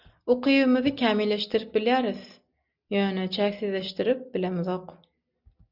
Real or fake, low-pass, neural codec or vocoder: real; 5.4 kHz; none